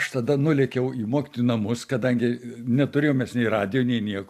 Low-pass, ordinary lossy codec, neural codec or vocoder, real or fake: 14.4 kHz; AAC, 96 kbps; none; real